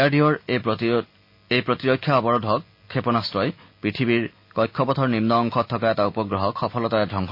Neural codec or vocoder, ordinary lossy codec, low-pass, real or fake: none; MP3, 32 kbps; 5.4 kHz; real